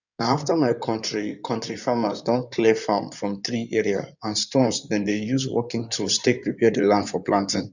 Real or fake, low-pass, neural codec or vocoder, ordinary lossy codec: fake; 7.2 kHz; codec, 16 kHz in and 24 kHz out, 2.2 kbps, FireRedTTS-2 codec; none